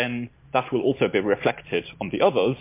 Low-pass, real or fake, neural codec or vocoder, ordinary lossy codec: 3.6 kHz; real; none; MP3, 24 kbps